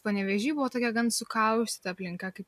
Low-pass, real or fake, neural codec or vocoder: 14.4 kHz; real; none